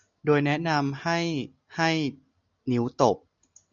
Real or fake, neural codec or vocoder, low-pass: real; none; 7.2 kHz